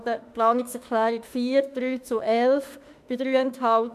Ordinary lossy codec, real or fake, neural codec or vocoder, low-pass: none; fake; autoencoder, 48 kHz, 32 numbers a frame, DAC-VAE, trained on Japanese speech; 14.4 kHz